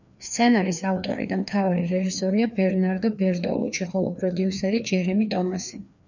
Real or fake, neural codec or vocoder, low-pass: fake; codec, 16 kHz, 2 kbps, FreqCodec, larger model; 7.2 kHz